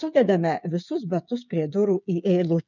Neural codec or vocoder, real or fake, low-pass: codec, 16 kHz, 8 kbps, FreqCodec, smaller model; fake; 7.2 kHz